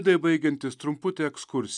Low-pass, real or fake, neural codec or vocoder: 10.8 kHz; real; none